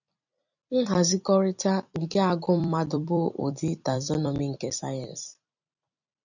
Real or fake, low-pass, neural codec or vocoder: fake; 7.2 kHz; vocoder, 44.1 kHz, 128 mel bands every 256 samples, BigVGAN v2